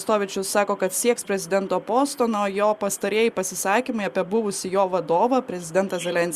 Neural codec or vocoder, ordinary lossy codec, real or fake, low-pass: none; Opus, 64 kbps; real; 14.4 kHz